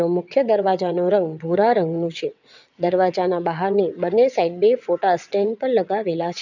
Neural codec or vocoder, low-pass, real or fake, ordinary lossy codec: vocoder, 22.05 kHz, 80 mel bands, WaveNeXt; 7.2 kHz; fake; none